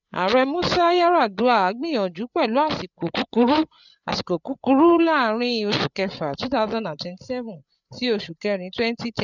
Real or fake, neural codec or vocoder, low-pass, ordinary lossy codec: fake; codec, 16 kHz, 8 kbps, FreqCodec, larger model; 7.2 kHz; none